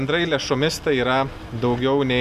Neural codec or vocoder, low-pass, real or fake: none; 14.4 kHz; real